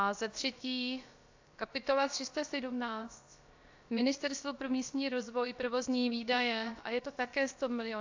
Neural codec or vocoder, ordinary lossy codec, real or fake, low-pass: codec, 16 kHz, about 1 kbps, DyCAST, with the encoder's durations; AAC, 48 kbps; fake; 7.2 kHz